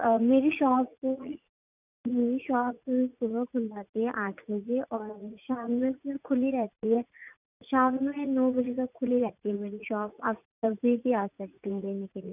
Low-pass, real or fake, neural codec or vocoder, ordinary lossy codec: 3.6 kHz; fake; vocoder, 44.1 kHz, 80 mel bands, Vocos; none